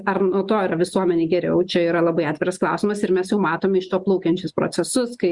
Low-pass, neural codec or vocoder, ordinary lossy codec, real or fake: 10.8 kHz; none; Opus, 64 kbps; real